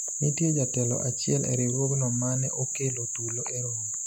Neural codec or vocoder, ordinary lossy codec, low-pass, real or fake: none; none; 19.8 kHz; real